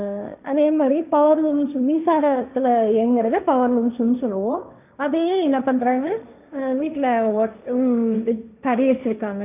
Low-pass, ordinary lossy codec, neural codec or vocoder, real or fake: 3.6 kHz; none; codec, 16 kHz, 1.1 kbps, Voila-Tokenizer; fake